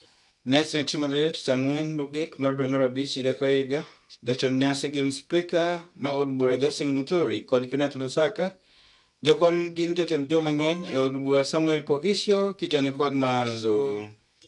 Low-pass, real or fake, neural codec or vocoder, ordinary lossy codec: 10.8 kHz; fake; codec, 24 kHz, 0.9 kbps, WavTokenizer, medium music audio release; none